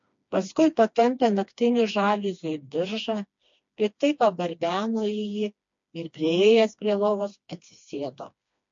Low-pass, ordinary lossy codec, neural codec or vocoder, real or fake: 7.2 kHz; MP3, 48 kbps; codec, 16 kHz, 2 kbps, FreqCodec, smaller model; fake